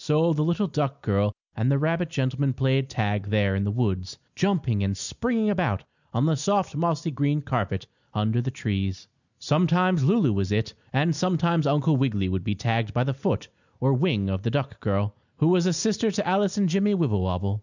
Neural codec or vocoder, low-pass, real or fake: none; 7.2 kHz; real